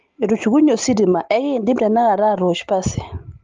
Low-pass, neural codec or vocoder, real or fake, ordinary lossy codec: 7.2 kHz; none; real; Opus, 24 kbps